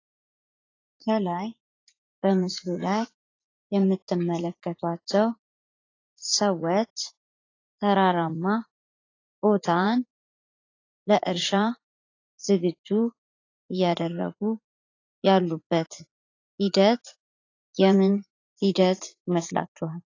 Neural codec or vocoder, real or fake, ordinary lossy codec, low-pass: vocoder, 44.1 kHz, 80 mel bands, Vocos; fake; AAC, 32 kbps; 7.2 kHz